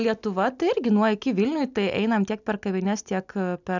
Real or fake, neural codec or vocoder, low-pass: real; none; 7.2 kHz